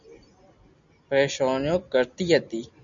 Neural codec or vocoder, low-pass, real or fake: none; 7.2 kHz; real